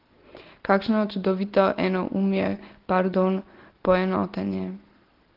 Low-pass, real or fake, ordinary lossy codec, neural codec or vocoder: 5.4 kHz; real; Opus, 16 kbps; none